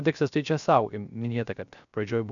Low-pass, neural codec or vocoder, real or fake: 7.2 kHz; codec, 16 kHz, 0.3 kbps, FocalCodec; fake